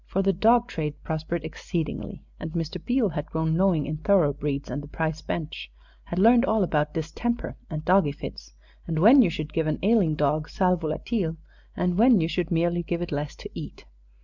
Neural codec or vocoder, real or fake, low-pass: none; real; 7.2 kHz